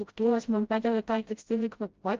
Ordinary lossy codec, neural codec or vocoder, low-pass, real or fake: Opus, 24 kbps; codec, 16 kHz, 0.5 kbps, FreqCodec, smaller model; 7.2 kHz; fake